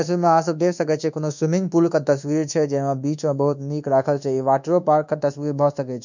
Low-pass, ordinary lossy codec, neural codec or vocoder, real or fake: 7.2 kHz; none; codec, 24 kHz, 1.2 kbps, DualCodec; fake